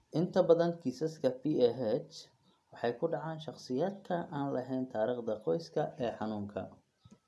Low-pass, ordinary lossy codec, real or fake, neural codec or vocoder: none; none; real; none